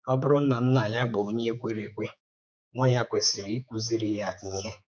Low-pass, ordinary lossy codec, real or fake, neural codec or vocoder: none; none; fake; codec, 16 kHz, 4 kbps, X-Codec, HuBERT features, trained on general audio